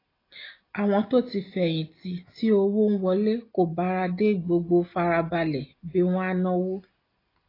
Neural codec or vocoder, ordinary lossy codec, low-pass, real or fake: none; AAC, 24 kbps; 5.4 kHz; real